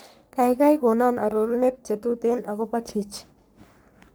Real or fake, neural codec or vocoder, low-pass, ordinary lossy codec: fake; codec, 44.1 kHz, 3.4 kbps, Pupu-Codec; none; none